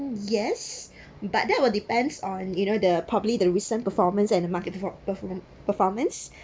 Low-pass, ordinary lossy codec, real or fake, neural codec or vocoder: none; none; real; none